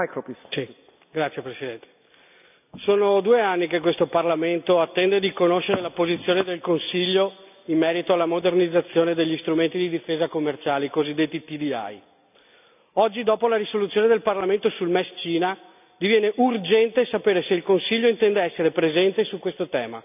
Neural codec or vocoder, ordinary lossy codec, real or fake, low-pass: none; none; real; 3.6 kHz